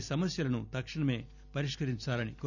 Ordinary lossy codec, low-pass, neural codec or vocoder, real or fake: none; 7.2 kHz; none; real